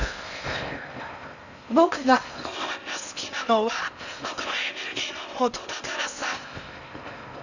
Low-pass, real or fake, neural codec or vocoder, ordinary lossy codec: 7.2 kHz; fake; codec, 16 kHz in and 24 kHz out, 0.6 kbps, FocalCodec, streaming, 4096 codes; none